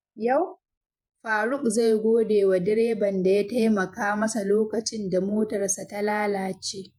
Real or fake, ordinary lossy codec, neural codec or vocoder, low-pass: fake; MP3, 96 kbps; vocoder, 48 kHz, 128 mel bands, Vocos; 19.8 kHz